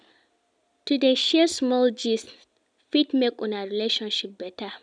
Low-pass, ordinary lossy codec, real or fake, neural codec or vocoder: 9.9 kHz; none; real; none